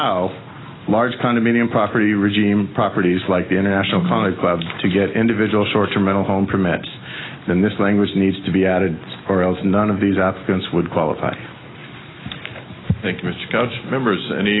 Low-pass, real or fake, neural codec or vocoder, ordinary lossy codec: 7.2 kHz; real; none; AAC, 16 kbps